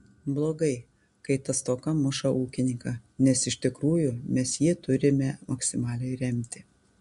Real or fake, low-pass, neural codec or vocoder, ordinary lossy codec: real; 10.8 kHz; none; MP3, 64 kbps